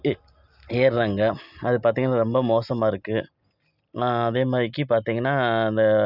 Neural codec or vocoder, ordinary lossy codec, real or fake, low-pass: none; none; real; 5.4 kHz